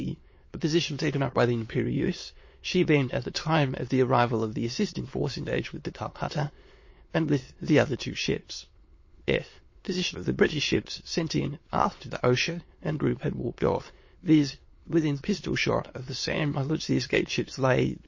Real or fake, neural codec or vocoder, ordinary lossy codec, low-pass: fake; autoencoder, 22.05 kHz, a latent of 192 numbers a frame, VITS, trained on many speakers; MP3, 32 kbps; 7.2 kHz